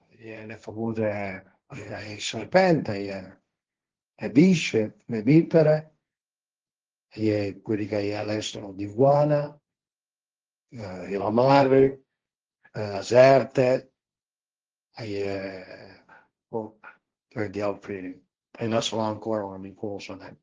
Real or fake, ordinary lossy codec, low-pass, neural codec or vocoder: fake; Opus, 16 kbps; 7.2 kHz; codec, 16 kHz, 1.1 kbps, Voila-Tokenizer